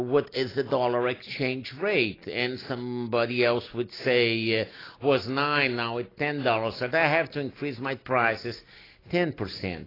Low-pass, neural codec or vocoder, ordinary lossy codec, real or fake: 5.4 kHz; none; AAC, 24 kbps; real